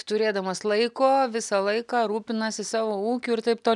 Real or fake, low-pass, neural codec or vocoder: real; 10.8 kHz; none